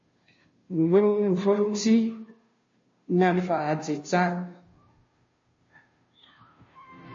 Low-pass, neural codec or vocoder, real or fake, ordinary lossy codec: 7.2 kHz; codec, 16 kHz, 0.5 kbps, FunCodec, trained on Chinese and English, 25 frames a second; fake; MP3, 32 kbps